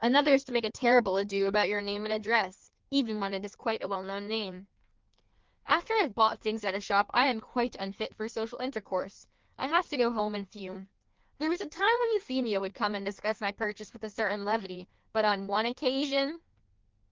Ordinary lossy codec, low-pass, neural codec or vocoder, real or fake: Opus, 32 kbps; 7.2 kHz; codec, 16 kHz in and 24 kHz out, 1.1 kbps, FireRedTTS-2 codec; fake